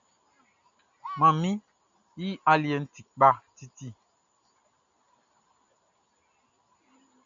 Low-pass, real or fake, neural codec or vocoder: 7.2 kHz; real; none